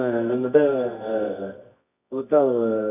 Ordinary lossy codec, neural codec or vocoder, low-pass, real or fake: none; codec, 24 kHz, 0.9 kbps, WavTokenizer, medium music audio release; 3.6 kHz; fake